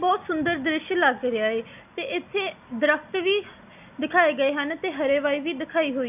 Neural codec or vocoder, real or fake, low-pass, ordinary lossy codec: none; real; 3.6 kHz; none